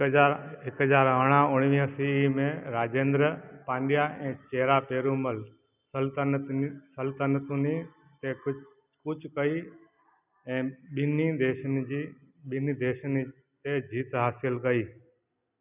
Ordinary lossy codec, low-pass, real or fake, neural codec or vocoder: none; 3.6 kHz; real; none